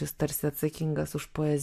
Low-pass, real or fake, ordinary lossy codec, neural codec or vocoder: 14.4 kHz; real; MP3, 64 kbps; none